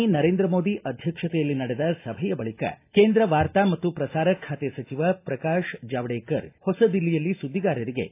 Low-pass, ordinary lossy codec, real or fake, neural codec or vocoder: 3.6 kHz; AAC, 24 kbps; real; none